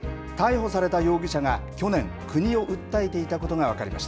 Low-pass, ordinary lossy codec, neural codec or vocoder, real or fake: none; none; none; real